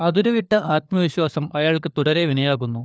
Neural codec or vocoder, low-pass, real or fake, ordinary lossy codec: codec, 16 kHz, 4 kbps, FunCodec, trained on LibriTTS, 50 frames a second; none; fake; none